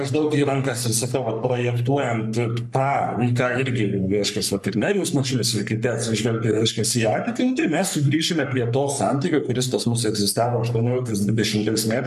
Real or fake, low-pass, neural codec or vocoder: fake; 14.4 kHz; codec, 44.1 kHz, 3.4 kbps, Pupu-Codec